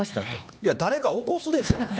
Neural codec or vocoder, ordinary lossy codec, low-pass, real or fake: codec, 16 kHz, 2 kbps, X-Codec, HuBERT features, trained on LibriSpeech; none; none; fake